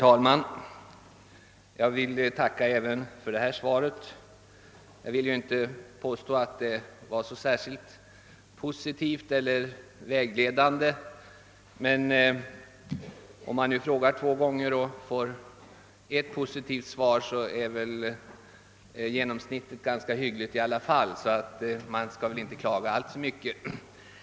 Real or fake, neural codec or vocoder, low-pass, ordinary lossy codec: real; none; none; none